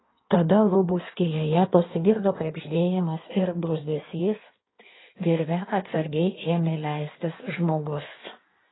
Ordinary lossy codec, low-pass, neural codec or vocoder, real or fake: AAC, 16 kbps; 7.2 kHz; codec, 16 kHz in and 24 kHz out, 1.1 kbps, FireRedTTS-2 codec; fake